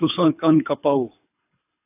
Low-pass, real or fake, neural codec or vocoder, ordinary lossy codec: 3.6 kHz; fake; codec, 24 kHz, 3 kbps, HILCodec; AAC, 32 kbps